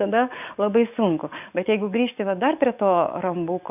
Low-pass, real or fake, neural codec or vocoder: 3.6 kHz; fake; codec, 44.1 kHz, 7.8 kbps, DAC